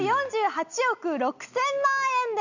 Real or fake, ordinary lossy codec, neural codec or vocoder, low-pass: real; none; none; 7.2 kHz